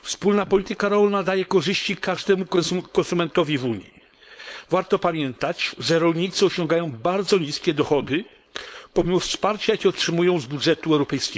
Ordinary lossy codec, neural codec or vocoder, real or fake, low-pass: none; codec, 16 kHz, 4.8 kbps, FACodec; fake; none